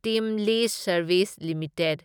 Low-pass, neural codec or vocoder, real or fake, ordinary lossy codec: none; none; real; none